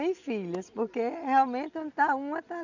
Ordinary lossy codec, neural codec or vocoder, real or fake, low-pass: none; none; real; 7.2 kHz